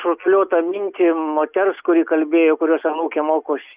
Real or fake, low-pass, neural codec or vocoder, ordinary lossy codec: real; 3.6 kHz; none; Opus, 64 kbps